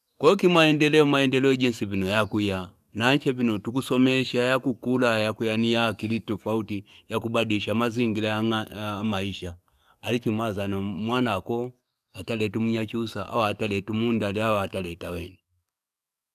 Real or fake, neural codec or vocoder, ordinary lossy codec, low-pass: fake; codec, 44.1 kHz, 7.8 kbps, DAC; none; 14.4 kHz